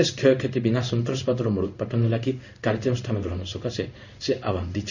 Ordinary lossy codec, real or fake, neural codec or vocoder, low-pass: none; fake; codec, 16 kHz in and 24 kHz out, 1 kbps, XY-Tokenizer; 7.2 kHz